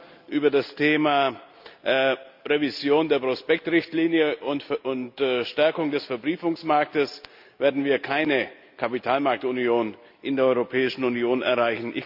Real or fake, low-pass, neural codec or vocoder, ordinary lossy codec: real; 5.4 kHz; none; AAC, 48 kbps